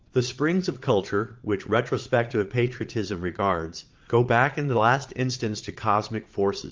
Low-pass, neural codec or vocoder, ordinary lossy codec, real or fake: 7.2 kHz; vocoder, 22.05 kHz, 80 mel bands, Vocos; Opus, 32 kbps; fake